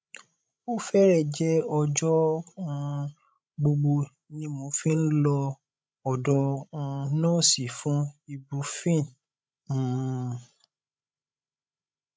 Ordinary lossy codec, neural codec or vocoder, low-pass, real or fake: none; codec, 16 kHz, 16 kbps, FreqCodec, larger model; none; fake